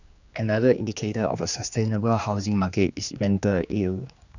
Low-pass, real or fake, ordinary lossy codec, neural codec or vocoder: 7.2 kHz; fake; none; codec, 16 kHz, 2 kbps, X-Codec, HuBERT features, trained on general audio